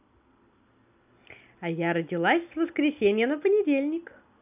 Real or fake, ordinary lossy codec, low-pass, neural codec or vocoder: real; none; 3.6 kHz; none